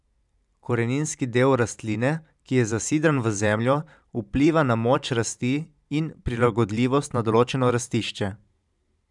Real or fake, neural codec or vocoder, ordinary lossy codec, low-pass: fake; vocoder, 44.1 kHz, 128 mel bands every 512 samples, BigVGAN v2; none; 10.8 kHz